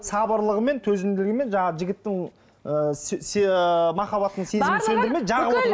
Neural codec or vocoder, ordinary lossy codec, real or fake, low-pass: none; none; real; none